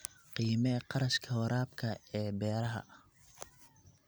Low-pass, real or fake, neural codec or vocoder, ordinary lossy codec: none; real; none; none